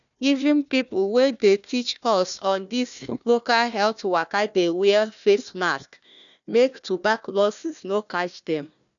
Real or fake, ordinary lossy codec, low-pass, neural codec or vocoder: fake; none; 7.2 kHz; codec, 16 kHz, 1 kbps, FunCodec, trained on Chinese and English, 50 frames a second